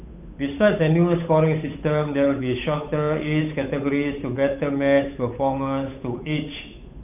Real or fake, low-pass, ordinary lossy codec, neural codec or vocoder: fake; 3.6 kHz; none; codec, 16 kHz, 8 kbps, FunCodec, trained on Chinese and English, 25 frames a second